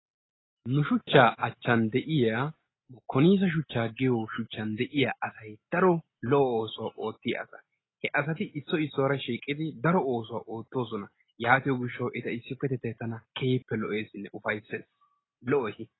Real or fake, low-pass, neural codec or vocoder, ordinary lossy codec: real; 7.2 kHz; none; AAC, 16 kbps